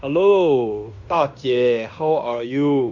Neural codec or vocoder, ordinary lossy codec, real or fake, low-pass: codec, 16 kHz in and 24 kHz out, 0.9 kbps, LongCat-Audio-Codec, fine tuned four codebook decoder; none; fake; 7.2 kHz